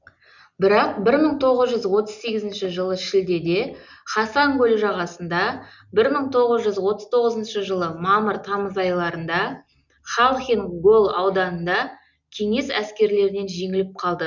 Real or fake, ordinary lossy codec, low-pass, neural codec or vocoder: real; none; 7.2 kHz; none